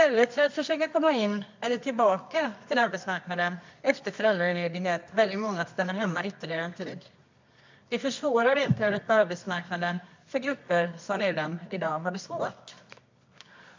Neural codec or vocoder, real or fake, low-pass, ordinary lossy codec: codec, 24 kHz, 0.9 kbps, WavTokenizer, medium music audio release; fake; 7.2 kHz; MP3, 64 kbps